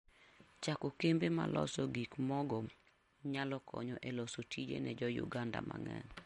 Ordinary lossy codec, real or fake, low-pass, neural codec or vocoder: MP3, 48 kbps; real; 19.8 kHz; none